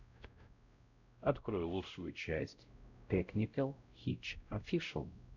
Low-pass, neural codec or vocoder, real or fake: 7.2 kHz; codec, 16 kHz, 0.5 kbps, X-Codec, WavLM features, trained on Multilingual LibriSpeech; fake